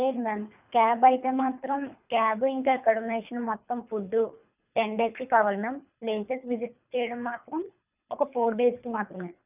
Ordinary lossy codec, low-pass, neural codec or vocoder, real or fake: none; 3.6 kHz; codec, 24 kHz, 3 kbps, HILCodec; fake